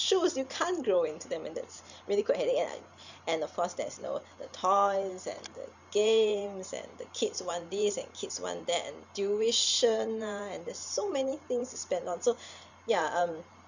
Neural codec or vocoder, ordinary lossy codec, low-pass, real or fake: vocoder, 44.1 kHz, 128 mel bands every 512 samples, BigVGAN v2; none; 7.2 kHz; fake